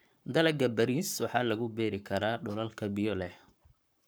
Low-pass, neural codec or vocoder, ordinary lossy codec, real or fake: none; codec, 44.1 kHz, 7.8 kbps, Pupu-Codec; none; fake